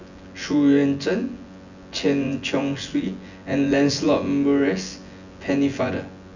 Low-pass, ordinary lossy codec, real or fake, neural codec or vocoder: 7.2 kHz; none; fake; vocoder, 24 kHz, 100 mel bands, Vocos